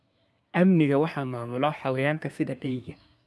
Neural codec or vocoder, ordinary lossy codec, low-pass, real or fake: codec, 24 kHz, 1 kbps, SNAC; none; none; fake